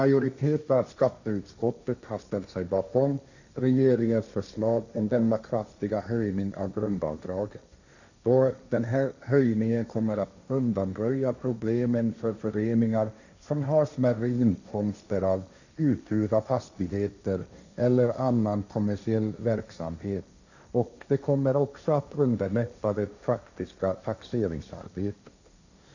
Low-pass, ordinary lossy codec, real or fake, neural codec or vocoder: 7.2 kHz; none; fake; codec, 16 kHz, 1.1 kbps, Voila-Tokenizer